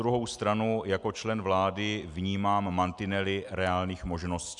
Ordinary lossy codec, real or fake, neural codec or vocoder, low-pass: MP3, 96 kbps; real; none; 10.8 kHz